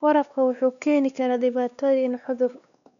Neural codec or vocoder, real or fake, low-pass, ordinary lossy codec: codec, 16 kHz, 2 kbps, X-Codec, WavLM features, trained on Multilingual LibriSpeech; fake; 7.2 kHz; none